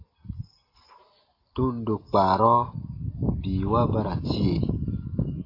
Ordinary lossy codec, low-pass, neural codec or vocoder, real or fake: AAC, 24 kbps; 5.4 kHz; none; real